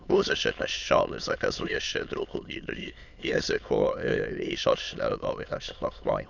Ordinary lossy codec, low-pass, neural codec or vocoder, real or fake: none; 7.2 kHz; autoencoder, 22.05 kHz, a latent of 192 numbers a frame, VITS, trained on many speakers; fake